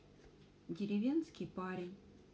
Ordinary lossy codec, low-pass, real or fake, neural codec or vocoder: none; none; real; none